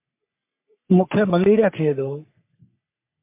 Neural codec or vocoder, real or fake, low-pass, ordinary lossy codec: codec, 44.1 kHz, 3.4 kbps, Pupu-Codec; fake; 3.6 kHz; MP3, 32 kbps